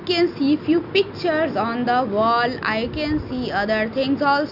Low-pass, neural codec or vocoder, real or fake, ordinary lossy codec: 5.4 kHz; none; real; none